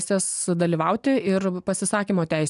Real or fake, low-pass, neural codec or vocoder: real; 10.8 kHz; none